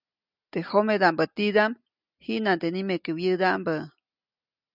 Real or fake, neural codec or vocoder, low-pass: real; none; 5.4 kHz